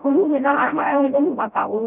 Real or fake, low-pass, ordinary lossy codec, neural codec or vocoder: fake; 3.6 kHz; none; codec, 16 kHz, 0.5 kbps, FreqCodec, smaller model